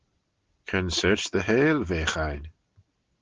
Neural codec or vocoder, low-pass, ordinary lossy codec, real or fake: none; 7.2 kHz; Opus, 16 kbps; real